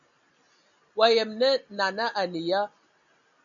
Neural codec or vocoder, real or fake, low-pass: none; real; 7.2 kHz